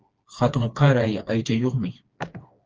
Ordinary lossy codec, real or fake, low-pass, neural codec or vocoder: Opus, 24 kbps; fake; 7.2 kHz; codec, 16 kHz, 2 kbps, FreqCodec, smaller model